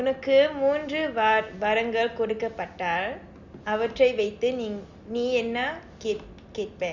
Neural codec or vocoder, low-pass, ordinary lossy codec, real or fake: none; 7.2 kHz; Opus, 64 kbps; real